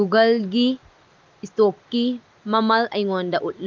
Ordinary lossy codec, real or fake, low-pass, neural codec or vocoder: Opus, 24 kbps; real; 7.2 kHz; none